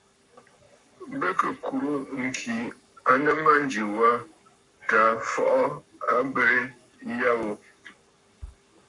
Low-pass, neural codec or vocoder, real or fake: 10.8 kHz; codec, 44.1 kHz, 7.8 kbps, Pupu-Codec; fake